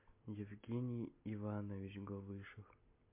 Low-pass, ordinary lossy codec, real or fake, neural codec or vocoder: 3.6 kHz; MP3, 32 kbps; real; none